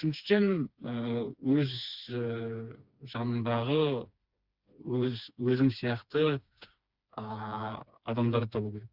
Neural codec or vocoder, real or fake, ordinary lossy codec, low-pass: codec, 16 kHz, 2 kbps, FreqCodec, smaller model; fake; Opus, 64 kbps; 5.4 kHz